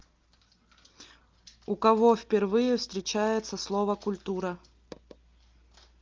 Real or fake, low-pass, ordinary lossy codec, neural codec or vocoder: real; 7.2 kHz; Opus, 24 kbps; none